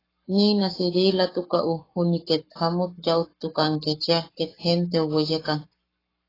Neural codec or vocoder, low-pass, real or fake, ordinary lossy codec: codec, 44.1 kHz, 7.8 kbps, Pupu-Codec; 5.4 kHz; fake; AAC, 24 kbps